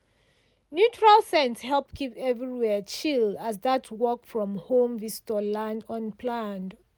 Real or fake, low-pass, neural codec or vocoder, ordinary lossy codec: real; none; none; none